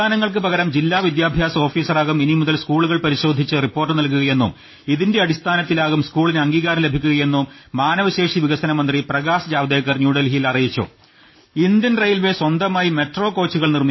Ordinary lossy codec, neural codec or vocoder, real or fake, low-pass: MP3, 24 kbps; none; real; 7.2 kHz